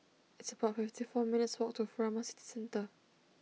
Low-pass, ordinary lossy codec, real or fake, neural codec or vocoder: none; none; real; none